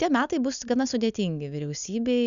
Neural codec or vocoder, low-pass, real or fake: none; 7.2 kHz; real